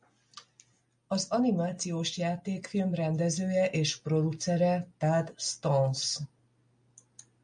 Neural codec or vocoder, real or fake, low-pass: none; real; 9.9 kHz